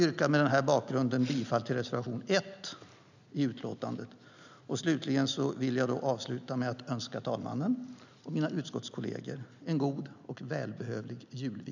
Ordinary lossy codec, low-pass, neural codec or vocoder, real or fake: none; 7.2 kHz; none; real